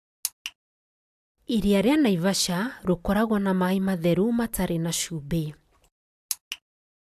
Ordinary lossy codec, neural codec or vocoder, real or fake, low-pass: AAC, 96 kbps; none; real; 14.4 kHz